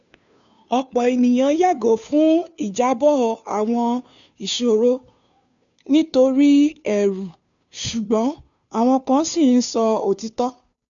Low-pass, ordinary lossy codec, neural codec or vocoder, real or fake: 7.2 kHz; none; codec, 16 kHz, 2 kbps, FunCodec, trained on Chinese and English, 25 frames a second; fake